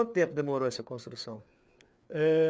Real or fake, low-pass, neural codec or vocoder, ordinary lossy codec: fake; none; codec, 16 kHz, 4 kbps, FreqCodec, larger model; none